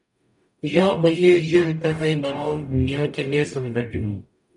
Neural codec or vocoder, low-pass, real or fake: codec, 44.1 kHz, 0.9 kbps, DAC; 10.8 kHz; fake